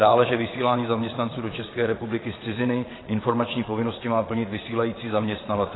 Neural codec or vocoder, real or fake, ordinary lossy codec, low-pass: vocoder, 22.05 kHz, 80 mel bands, Vocos; fake; AAC, 16 kbps; 7.2 kHz